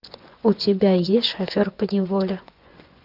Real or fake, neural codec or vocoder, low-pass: fake; vocoder, 24 kHz, 100 mel bands, Vocos; 5.4 kHz